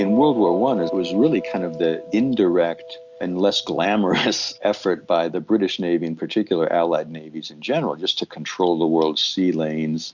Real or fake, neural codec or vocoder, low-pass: real; none; 7.2 kHz